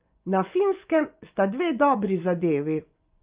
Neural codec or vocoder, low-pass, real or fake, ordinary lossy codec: vocoder, 24 kHz, 100 mel bands, Vocos; 3.6 kHz; fake; Opus, 24 kbps